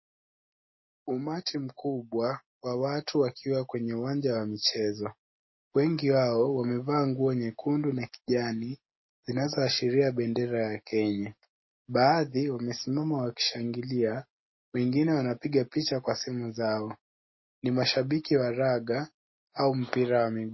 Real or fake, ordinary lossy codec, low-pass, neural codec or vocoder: real; MP3, 24 kbps; 7.2 kHz; none